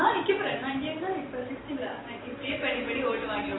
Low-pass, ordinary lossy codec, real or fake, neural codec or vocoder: 7.2 kHz; AAC, 16 kbps; real; none